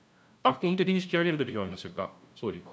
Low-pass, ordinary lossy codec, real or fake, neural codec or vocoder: none; none; fake; codec, 16 kHz, 0.5 kbps, FunCodec, trained on LibriTTS, 25 frames a second